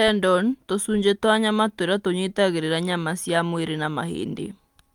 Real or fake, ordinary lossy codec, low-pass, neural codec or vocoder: real; Opus, 32 kbps; 19.8 kHz; none